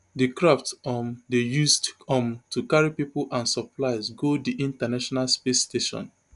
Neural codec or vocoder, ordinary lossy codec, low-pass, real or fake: none; none; 10.8 kHz; real